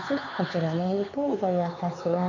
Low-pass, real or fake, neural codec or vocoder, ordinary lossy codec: 7.2 kHz; fake; codec, 16 kHz, 4 kbps, X-Codec, HuBERT features, trained on LibriSpeech; none